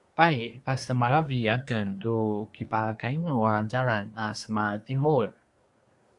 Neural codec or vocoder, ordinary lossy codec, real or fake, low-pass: codec, 24 kHz, 1 kbps, SNAC; AAC, 64 kbps; fake; 10.8 kHz